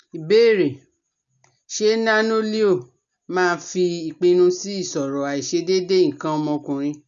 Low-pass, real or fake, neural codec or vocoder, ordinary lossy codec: 7.2 kHz; real; none; none